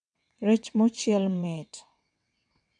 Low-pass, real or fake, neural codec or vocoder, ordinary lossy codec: 9.9 kHz; fake; vocoder, 22.05 kHz, 80 mel bands, WaveNeXt; none